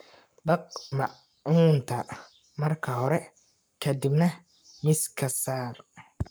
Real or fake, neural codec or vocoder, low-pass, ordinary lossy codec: fake; codec, 44.1 kHz, 7.8 kbps, Pupu-Codec; none; none